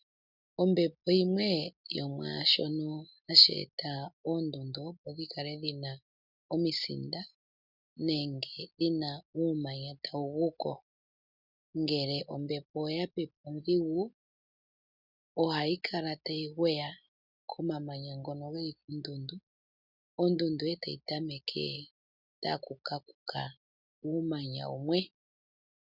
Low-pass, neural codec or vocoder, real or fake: 5.4 kHz; none; real